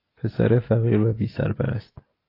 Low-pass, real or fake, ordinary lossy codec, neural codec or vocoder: 5.4 kHz; real; AAC, 24 kbps; none